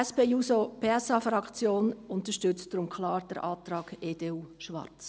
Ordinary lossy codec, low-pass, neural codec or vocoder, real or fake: none; none; none; real